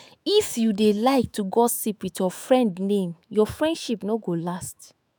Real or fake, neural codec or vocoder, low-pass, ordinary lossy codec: fake; autoencoder, 48 kHz, 128 numbers a frame, DAC-VAE, trained on Japanese speech; none; none